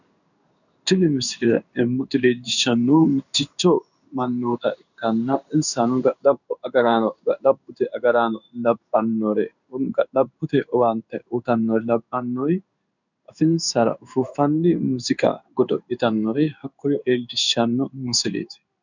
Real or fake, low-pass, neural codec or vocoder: fake; 7.2 kHz; codec, 16 kHz in and 24 kHz out, 1 kbps, XY-Tokenizer